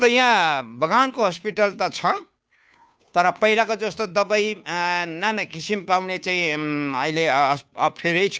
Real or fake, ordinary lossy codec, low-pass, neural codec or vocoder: fake; none; none; codec, 16 kHz, 2 kbps, FunCodec, trained on Chinese and English, 25 frames a second